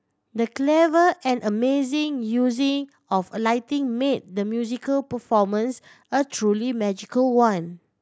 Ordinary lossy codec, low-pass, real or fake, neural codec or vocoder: none; none; real; none